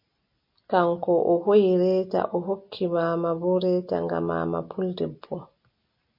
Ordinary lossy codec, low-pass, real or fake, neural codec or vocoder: MP3, 32 kbps; 5.4 kHz; real; none